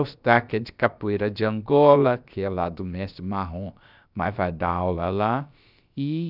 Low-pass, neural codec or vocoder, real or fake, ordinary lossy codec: 5.4 kHz; codec, 16 kHz, about 1 kbps, DyCAST, with the encoder's durations; fake; none